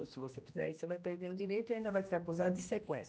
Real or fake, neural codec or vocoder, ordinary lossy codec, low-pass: fake; codec, 16 kHz, 1 kbps, X-Codec, HuBERT features, trained on general audio; none; none